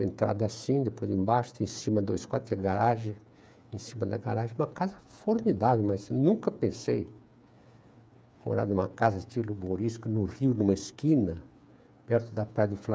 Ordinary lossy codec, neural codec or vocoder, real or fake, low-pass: none; codec, 16 kHz, 8 kbps, FreqCodec, smaller model; fake; none